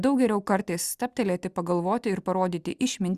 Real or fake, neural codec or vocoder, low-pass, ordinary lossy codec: real; none; 14.4 kHz; Opus, 64 kbps